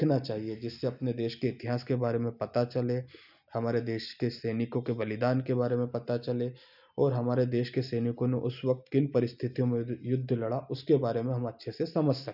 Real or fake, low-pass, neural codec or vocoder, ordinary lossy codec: real; 5.4 kHz; none; none